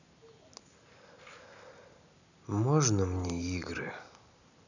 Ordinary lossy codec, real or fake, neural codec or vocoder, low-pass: none; real; none; 7.2 kHz